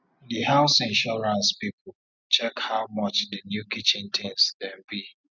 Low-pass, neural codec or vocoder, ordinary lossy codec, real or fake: 7.2 kHz; none; none; real